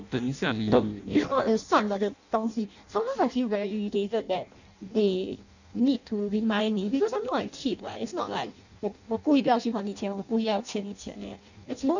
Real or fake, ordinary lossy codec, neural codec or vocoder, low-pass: fake; none; codec, 16 kHz in and 24 kHz out, 0.6 kbps, FireRedTTS-2 codec; 7.2 kHz